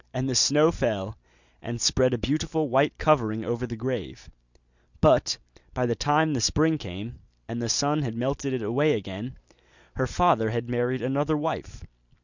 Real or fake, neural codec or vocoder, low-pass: real; none; 7.2 kHz